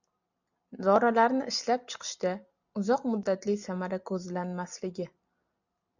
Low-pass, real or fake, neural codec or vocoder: 7.2 kHz; real; none